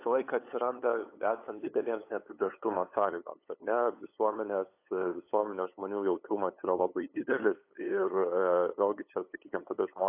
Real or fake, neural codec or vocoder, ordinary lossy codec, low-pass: fake; codec, 16 kHz, 8 kbps, FunCodec, trained on LibriTTS, 25 frames a second; AAC, 24 kbps; 3.6 kHz